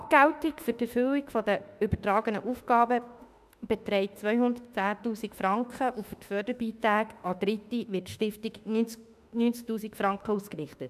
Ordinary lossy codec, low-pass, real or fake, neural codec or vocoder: none; 14.4 kHz; fake; autoencoder, 48 kHz, 32 numbers a frame, DAC-VAE, trained on Japanese speech